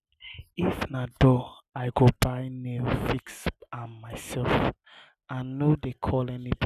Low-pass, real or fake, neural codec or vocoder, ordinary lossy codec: 14.4 kHz; real; none; none